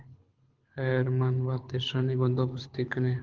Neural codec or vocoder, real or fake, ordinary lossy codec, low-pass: codec, 24 kHz, 6 kbps, HILCodec; fake; Opus, 16 kbps; 7.2 kHz